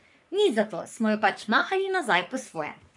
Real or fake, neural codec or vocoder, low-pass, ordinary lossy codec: fake; codec, 44.1 kHz, 3.4 kbps, Pupu-Codec; 10.8 kHz; none